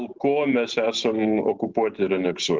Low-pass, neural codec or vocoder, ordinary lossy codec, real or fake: 7.2 kHz; none; Opus, 16 kbps; real